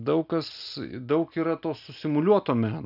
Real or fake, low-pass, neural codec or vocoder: real; 5.4 kHz; none